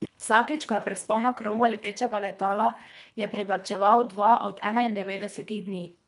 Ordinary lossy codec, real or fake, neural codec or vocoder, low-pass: none; fake; codec, 24 kHz, 1.5 kbps, HILCodec; 10.8 kHz